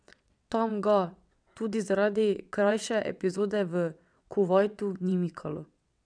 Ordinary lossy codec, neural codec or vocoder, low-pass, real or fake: none; vocoder, 22.05 kHz, 80 mel bands, WaveNeXt; 9.9 kHz; fake